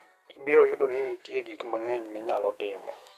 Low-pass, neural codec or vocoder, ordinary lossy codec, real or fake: 14.4 kHz; codec, 44.1 kHz, 2.6 kbps, SNAC; none; fake